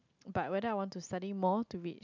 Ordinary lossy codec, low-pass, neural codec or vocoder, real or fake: none; 7.2 kHz; none; real